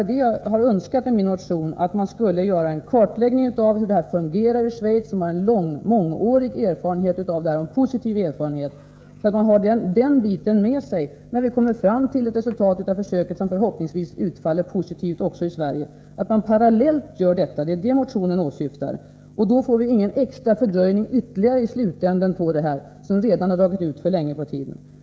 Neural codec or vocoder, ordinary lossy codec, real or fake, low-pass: codec, 16 kHz, 16 kbps, FreqCodec, smaller model; none; fake; none